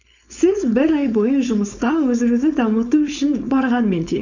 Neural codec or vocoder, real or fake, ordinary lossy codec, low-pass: codec, 16 kHz, 4.8 kbps, FACodec; fake; none; 7.2 kHz